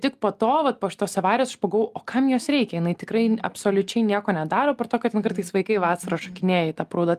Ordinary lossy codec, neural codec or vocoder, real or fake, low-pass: Opus, 32 kbps; none; real; 14.4 kHz